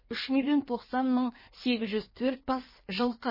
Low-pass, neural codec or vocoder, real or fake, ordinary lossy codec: 5.4 kHz; codec, 16 kHz in and 24 kHz out, 1.1 kbps, FireRedTTS-2 codec; fake; MP3, 24 kbps